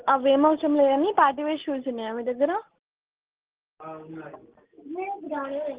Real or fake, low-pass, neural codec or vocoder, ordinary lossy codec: real; 3.6 kHz; none; Opus, 16 kbps